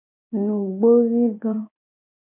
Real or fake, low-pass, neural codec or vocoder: fake; 3.6 kHz; vocoder, 24 kHz, 100 mel bands, Vocos